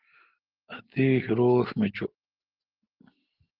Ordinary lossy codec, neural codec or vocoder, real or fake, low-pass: Opus, 16 kbps; none; real; 5.4 kHz